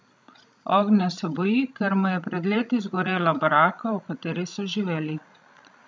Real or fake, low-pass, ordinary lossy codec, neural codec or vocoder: fake; none; none; codec, 16 kHz, 16 kbps, FreqCodec, larger model